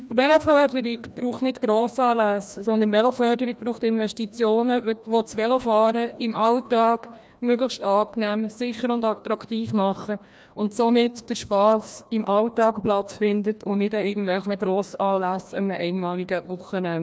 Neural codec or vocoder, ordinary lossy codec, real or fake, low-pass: codec, 16 kHz, 1 kbps, FreqCodec, larger model; none; fake; none